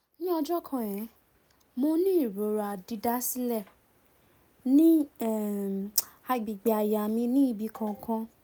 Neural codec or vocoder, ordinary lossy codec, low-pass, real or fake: none; none; none; real